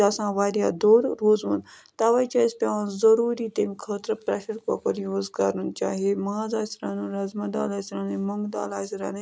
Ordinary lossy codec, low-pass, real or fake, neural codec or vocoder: none; none; real; none